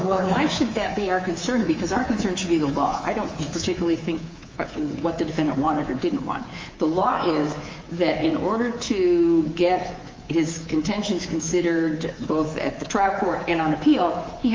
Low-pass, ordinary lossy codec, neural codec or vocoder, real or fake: 7.2 kHz; Opus, 32 kbps; codec, 24 kHz, 3.1 kbps, DualCodec; fake